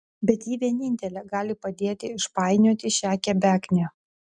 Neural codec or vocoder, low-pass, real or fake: none; 9.9 kHz; real